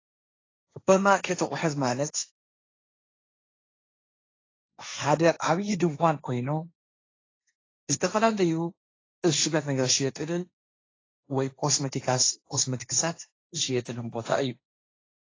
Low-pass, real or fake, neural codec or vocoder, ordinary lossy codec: 7.2 kHz; fake; codec, 16 kHz, 1.1 kbps, Voila-Tokenizer; AAC, 32 kbps